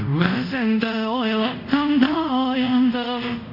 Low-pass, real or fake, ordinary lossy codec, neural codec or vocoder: 5.4 kHz; fake; none; codec, 24 kHz, 0.5 kbps, DualCodec